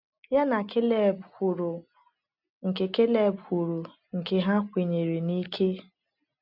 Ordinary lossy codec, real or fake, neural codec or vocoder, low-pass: none; real; none; 5.4 kHz